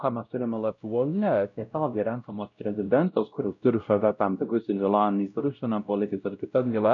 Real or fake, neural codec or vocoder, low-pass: fake; codec, 16 kHz, 0.5 kbps, X-Codec, WavLM features, trained on Multilingual LibriSpeech; 7.2 kHz